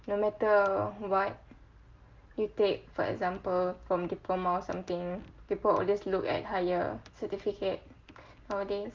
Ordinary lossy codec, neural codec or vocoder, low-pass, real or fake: Opus, 32 kbps; none; 7.2 kHz; real